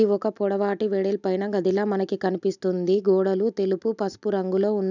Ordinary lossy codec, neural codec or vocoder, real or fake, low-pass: none; none; real; 7.2 kHz